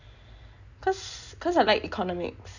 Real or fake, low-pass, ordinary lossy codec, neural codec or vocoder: real; 7.2 kHz; none; none